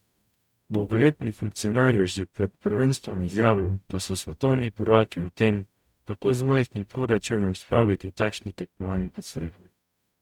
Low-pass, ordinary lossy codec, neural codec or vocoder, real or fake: 19.8 kHz; none; codec, 44.1 kHz, 0.9 kbps, DAC; fake